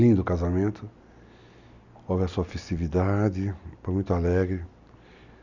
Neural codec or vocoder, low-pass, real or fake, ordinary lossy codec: none; 7.2 kHz; real; none